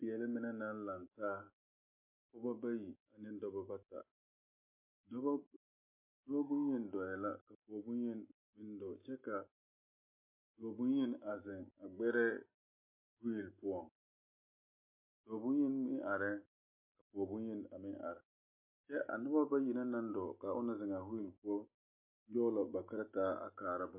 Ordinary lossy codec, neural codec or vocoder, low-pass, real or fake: MP3, 24 kbps; none; 3.6 kHz; real